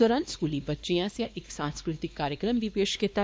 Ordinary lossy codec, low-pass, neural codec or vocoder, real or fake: none; none; codec, 16 kHz, 2 kbps, X-Codec, WavLM features, trained on Multilingual LibriSpeech; fake